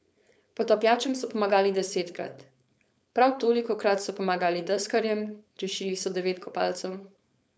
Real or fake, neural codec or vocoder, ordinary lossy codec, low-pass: fake; codec, 16 kHz, 4.8 kbps, FACodec; none; none